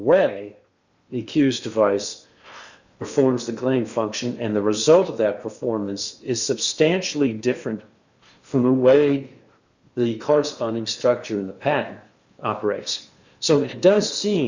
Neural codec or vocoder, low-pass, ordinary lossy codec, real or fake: codec, 16 kHz in and 24 kHz out, 0.8 kbps, FocalCodec, streaming, 65536 codes; 7.2 kHz; Opus, 64 kbps; fake